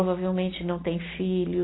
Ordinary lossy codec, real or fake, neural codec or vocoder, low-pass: AAC, 16 kbps; real; none; 7.2 kHz